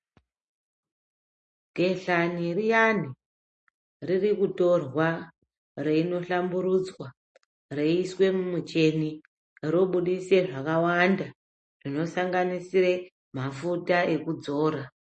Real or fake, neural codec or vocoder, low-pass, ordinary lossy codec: real; none; 10.8 kHz; MP3, 32 kbps